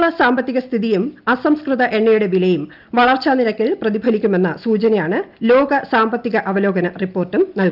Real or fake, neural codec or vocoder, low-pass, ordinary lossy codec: real; none; 5.4 kHz; Opus, 24 kbps